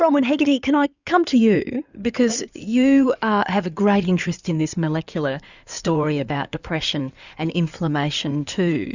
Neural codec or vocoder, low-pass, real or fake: codec, 16 kHz in and 24 kHz out, 2.2 kbps, FireRedTTS-2 codec; 7.2 kHz; fake